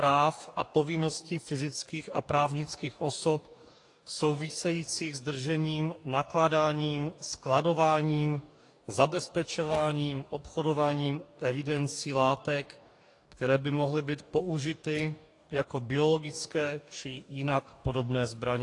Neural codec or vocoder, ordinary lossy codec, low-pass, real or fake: codec, 44.1 kHz, 2.6 kbps, DAC; AAC, 48 kbps; 10.8 kHz; fake